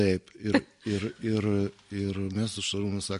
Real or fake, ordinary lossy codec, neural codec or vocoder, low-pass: real; MP3, 48 kbps; none; 10.8 kHz